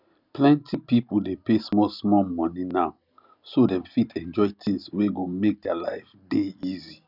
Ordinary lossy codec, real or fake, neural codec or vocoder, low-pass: none; real; none; 5.4 kHz